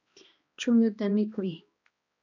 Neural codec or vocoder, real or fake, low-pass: codec, 16 kHz, 1 kbps, X-Codec, HuBERT features, trained on balanced general audio; fake; 7.2 kHz